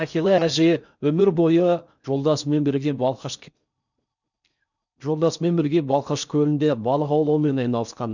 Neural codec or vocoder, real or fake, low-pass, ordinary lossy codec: codec, 16 kHz in and 24 kHz out, 0.6 kbps, FocalCodec, streaming, 4096 codes; fake; 7.2 kHz; none